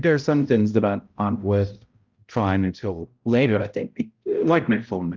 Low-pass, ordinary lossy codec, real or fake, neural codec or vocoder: 7.2 kHz; Opus, 32 kbps; fake; codec, 16 kHz, 0.5 kbps, X-Codec, HuBERT features, trained on balanced general audio